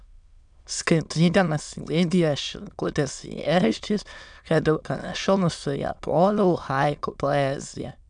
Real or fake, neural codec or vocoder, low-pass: fake; autoencoder, 22.05 kHz, a latent of 192 numbers a frame, VITS, trained on many speakers; 9.9 kHz